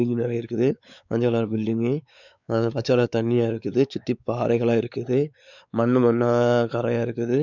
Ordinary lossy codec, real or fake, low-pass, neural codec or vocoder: none; fake; 7.2 kHz; codec, 16 kHz, 4 kbps, X-Codec, WavLM features, trained on Multilingual LibriSpeech